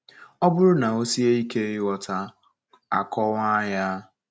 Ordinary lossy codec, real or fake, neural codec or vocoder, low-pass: none; real; none; none